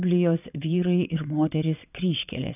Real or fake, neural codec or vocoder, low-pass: fake; vocoder, 22.05 kHz, 80 mel bands, WaveNeXt; 3.6 kHz